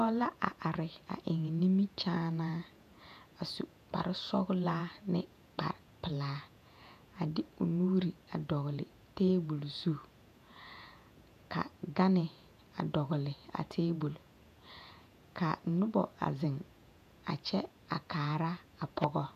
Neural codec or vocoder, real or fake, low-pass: vocoder, 48 kHz, 128 mel bands, Vocos; fake; 14.4 kHz